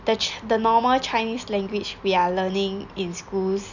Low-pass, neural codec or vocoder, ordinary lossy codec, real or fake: 7.2 kHz; none; none; real